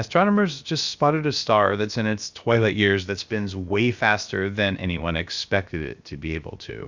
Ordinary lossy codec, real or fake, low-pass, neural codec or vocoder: Opus, 64 kbps; fake; 7.2 kHz; codec, 16 kHz, about 1 kbps, DyCAST, with the encoder's durations